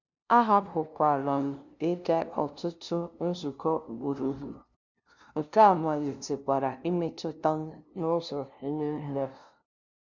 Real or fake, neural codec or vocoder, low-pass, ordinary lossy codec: fake; codec, 16 kHz, 0.5 kbps, FunCodec, trained on LibriTTS, 25 frames a second; 7.2 kHz; none